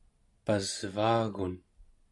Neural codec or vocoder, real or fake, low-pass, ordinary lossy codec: none; real; 10.8 kHz; MP3, 96 kbps